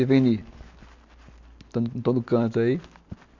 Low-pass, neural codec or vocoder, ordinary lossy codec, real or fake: 7.2 kHz; none; MP3, 64 kbps; real